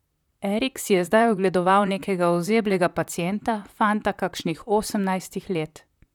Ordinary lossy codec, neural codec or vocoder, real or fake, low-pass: none; vocoder, 44.1 kHz, 128 mel bands, Pupu-Vocoder; fake; 19.8 kHz